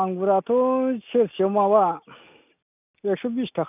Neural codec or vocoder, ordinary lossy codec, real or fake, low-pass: none; none; real; 3.6 kHz